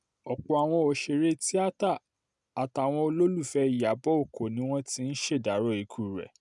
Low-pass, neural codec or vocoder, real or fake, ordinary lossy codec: 10.8 kHz; none; real; none